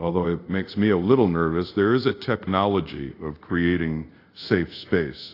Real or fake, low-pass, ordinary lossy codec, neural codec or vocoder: fake; 5.4 kHz; AAC, 32 kbps; codec, 24 kHz, 0.5 kbps, DualCodec